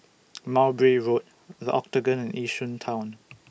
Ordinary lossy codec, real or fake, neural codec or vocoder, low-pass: none; real; none; none